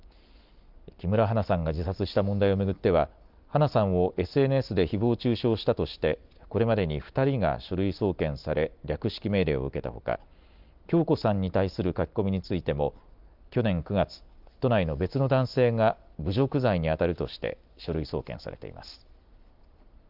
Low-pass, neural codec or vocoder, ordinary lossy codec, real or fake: 5.4 kHz; none; Opus, 24 kbps; real